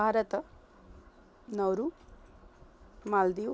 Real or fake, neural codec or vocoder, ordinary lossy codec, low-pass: real; none; none; none